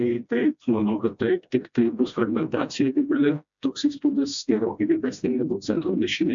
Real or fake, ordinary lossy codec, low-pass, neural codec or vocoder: fake; MP3, 64 kbps; 7.2 kHz; codec, 16 kHz, 1 kbps, FreqCodec, smaller model